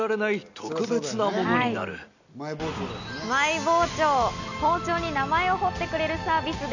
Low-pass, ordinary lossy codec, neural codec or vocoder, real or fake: 7.2 kHz; none; none; real